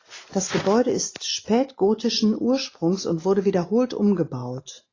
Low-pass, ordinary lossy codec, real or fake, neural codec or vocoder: 7.2 kHz; AAC, 32 kbps; real; none